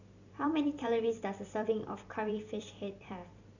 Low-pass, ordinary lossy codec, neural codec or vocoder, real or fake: 7.2 kHz; none; vocoder, 44.1 kHz, 128 mel bands every 256 samples, BigVGAN v2; fake